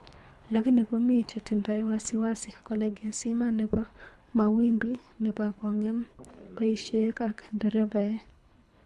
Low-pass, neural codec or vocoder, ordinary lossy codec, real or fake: none; codec, 24 kHz, 3 kbps, HILCodec; none; fake